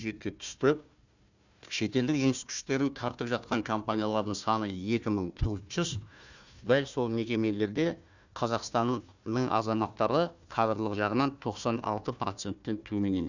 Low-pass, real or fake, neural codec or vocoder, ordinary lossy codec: 7.2 kHz; fake; codec, 16 kHz, 1 kbps, FunCodec, trained on Chinese and English, 50 frames a second; none